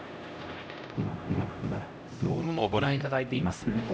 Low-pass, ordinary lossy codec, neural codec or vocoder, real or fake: none; none; codec, 16 kHz, 0.5 kbps, X-Codec, HuBERT features, trained on LibriSpeech; fake